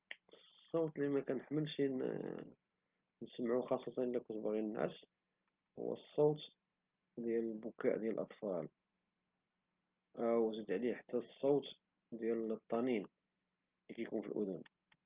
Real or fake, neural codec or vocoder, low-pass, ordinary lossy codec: real; none; 3.6 kHz; Opus, 24 kbps